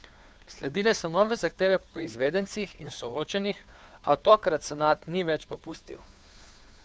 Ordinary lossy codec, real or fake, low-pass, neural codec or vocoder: none; fake; none; codec, 16 kHz, 2 kbps, FunCodec, trained on Chinese and English, 25 frames a second